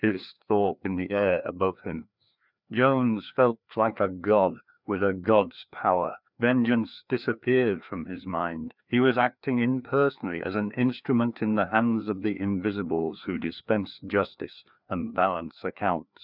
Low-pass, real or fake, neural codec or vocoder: 5.4 kHz; fake; codec, 16 kHz, 2 kbps, FreqCodec, larger model